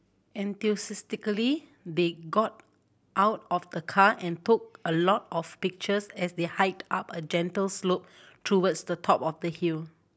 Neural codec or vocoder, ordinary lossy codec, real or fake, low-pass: none; none; real; none